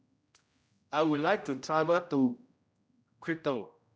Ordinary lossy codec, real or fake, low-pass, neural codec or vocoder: none; fake; none; codec, 16 kHz, 0.5 kbps, X-Codec, HuBERT features, trained on general audio